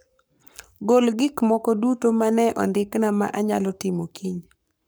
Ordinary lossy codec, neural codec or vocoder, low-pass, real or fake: none; codec, 44.1 kHz, 7.8 kbps, Pupu-Codec; none; fake